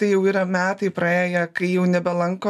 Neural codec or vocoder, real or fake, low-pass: none; real; 14.4 kHz